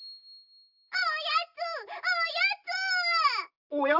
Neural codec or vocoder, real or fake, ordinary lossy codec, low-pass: none; real; none; 5.4 kHz